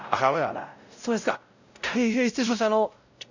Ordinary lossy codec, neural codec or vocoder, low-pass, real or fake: none; codec, 16 kHz, 0.5 kbps, X-Codec, WavLM features, trained on Multilingual LibriSpeech; 7.2 kHz; fake